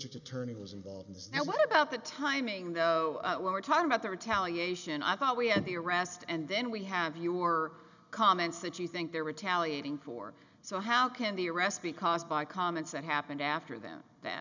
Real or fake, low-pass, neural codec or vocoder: real; 7.2 kHz; none